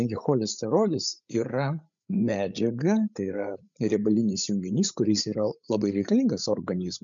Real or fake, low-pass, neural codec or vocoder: fake; 7.2 kHz; codec, 16 kHz, 8 kbps, FreqCodec, larger model